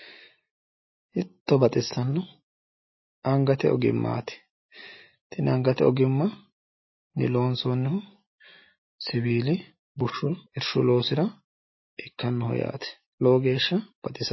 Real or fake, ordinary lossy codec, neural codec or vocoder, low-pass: real; MP3, 24 kbps; none; 7.2 kHz